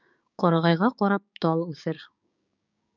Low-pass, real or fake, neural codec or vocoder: 7.2 kHz; fake; codec, 16 kHz, 6 kbps, DAC